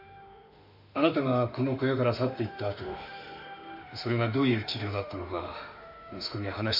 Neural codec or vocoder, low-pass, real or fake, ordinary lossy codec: autoencoder, 48 kHz, 32 numbers a frame, DAC-VAE, trained on Japanese speech; 5.4 kHz; fake; MP3, 48 kbps